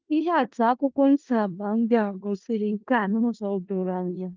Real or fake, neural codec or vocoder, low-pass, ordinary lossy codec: fake; codec, 16 kHz in and 24 kHz out, 0.4 kbps, LongCat-Audio-Codec, four codebook decoder; 7.2 kHz; Opus, 32 kbps